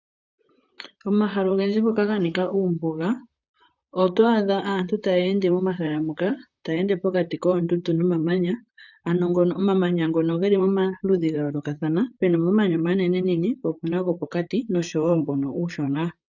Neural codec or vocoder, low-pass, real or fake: vocoder, 44.1 kHz, 128 mel bands, Pupu-Vocoder; 7.2 kHz; fake